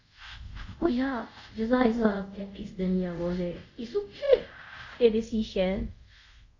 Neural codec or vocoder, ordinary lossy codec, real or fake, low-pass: codec, 24 kHz, 0.5 kbps, DualCodec; AAC, 48 kbps; fake; 7.2 kHz